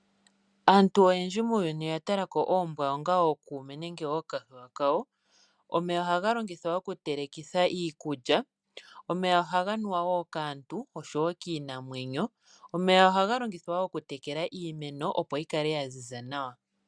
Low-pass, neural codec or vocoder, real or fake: 9.9 kHz; none; real